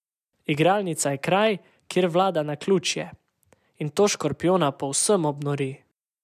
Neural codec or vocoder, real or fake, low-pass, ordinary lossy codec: none; real; 14.4 kHz; none